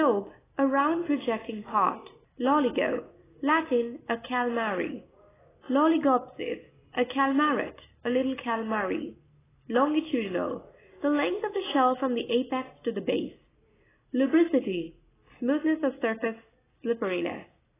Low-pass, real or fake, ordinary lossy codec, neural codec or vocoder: 3.6 kHz; real; AAC, 16 kbps; none